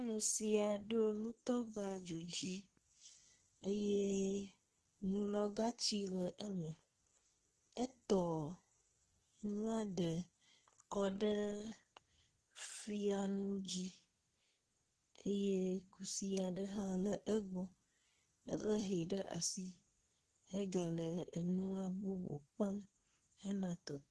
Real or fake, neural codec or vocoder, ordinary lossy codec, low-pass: fake; codec, 24 kHz, 1 kbps, SNAC; Opus, 16 kbps; 10.8 kHz